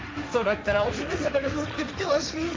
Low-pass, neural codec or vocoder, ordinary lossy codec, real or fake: none; codec, 16 kHz, 1.1 kbps, Voila-Tokenizer; none; fake